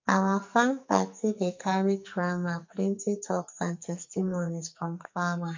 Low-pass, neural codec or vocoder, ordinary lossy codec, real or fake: 7.2 kHz; codec, 44.1 kHz, 2.6 kbps, SNAC; MP3, 48 kbps; fake